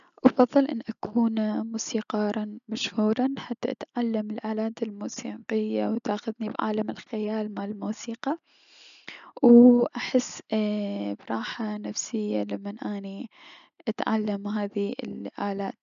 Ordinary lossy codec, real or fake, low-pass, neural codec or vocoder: none; real; 7.2 kHz; none